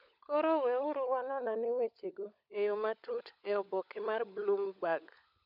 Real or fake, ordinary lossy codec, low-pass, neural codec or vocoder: fake; none; 5.4 kHz; codec, 16 kHz, 16 kbps, FunCodec, trained on LibriTTS, 50 frames a second